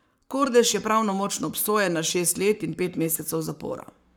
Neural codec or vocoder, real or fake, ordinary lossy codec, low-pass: codec, 44.1 kHz, 7.8 kbps, Pupu-Codec; fake; none; none